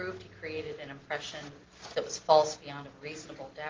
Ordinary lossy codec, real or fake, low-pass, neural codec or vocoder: Opus, 16 kbps; real; 7.2 kHz; none